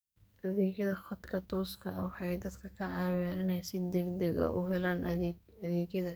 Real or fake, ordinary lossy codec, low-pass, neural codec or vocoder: fake; none; none; codec, 44.1 kHz, 2.6 kbps, SNAC